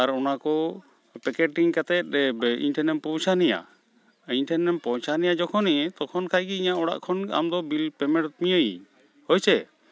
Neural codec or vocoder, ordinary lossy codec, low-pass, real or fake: none; none; none; real